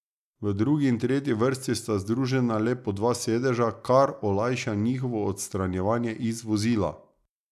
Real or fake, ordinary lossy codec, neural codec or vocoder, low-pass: real; none; none; 14.4 kHz